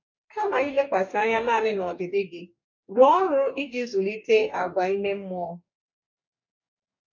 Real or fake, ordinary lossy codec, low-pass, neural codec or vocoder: fake; none; 7.2 kHz; codec, 44.1 kHz, 2.6 kbps, DAC